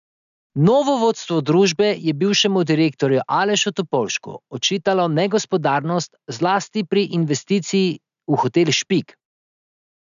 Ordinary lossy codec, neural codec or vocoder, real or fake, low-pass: MP3, 96 kbps; none; real; 7.2 kHz